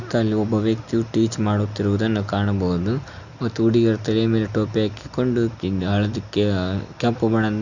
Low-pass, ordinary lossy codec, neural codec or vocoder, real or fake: 7.2 kHz; none; none; real